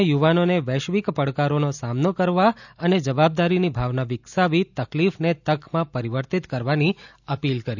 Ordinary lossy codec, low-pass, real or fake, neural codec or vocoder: none; 7.2 kHz; real; none